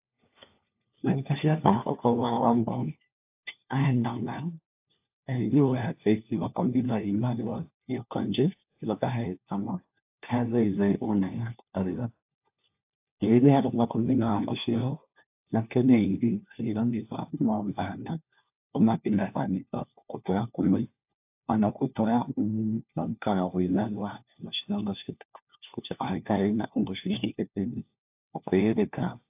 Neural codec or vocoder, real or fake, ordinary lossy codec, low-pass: codec, 16 kHz, 1 kbps, FunCodec, trained on LibriTTS, 50 frames a second; fake; AAC, 32 kbps; 3.6 kHz